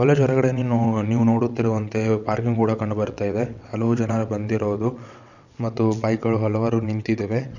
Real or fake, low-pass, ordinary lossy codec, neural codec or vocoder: fake; 7.2 kHz; none; vocoder, 22.05 kHz, 80 mel bands, WaveNeXt